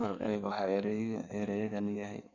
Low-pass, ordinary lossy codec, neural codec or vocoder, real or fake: 7.2 kHz; none; codec, 16 kHz in and 24 kHz out, 1.1 kbps, FireRedTTS-2 codec; fake